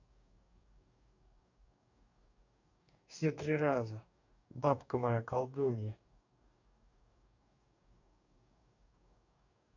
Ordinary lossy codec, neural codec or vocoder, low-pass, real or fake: none; codec, 44.1 kHz, 2.6 kbps, DAC; 7.2 kHz; fake